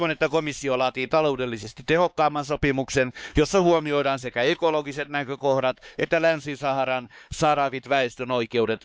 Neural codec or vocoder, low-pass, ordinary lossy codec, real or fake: codec, 16 kHz, 4 kbps, X-Codec, HuBERT features, trained on LibriSpeech; none; none; fake